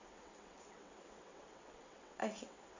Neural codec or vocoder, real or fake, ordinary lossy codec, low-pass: vocoder, 22.05 kHz, 80 mel bands, WaveNeXt; fake; AAC, 48 kbps; 7.2 kHz